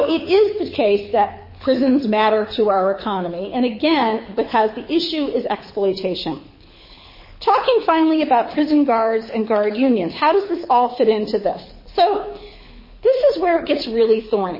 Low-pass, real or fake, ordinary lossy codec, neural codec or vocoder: 5.4 kHz; fake; MP3, 24 kbps; codec, 24 kHz, 6 kbps, HILCodec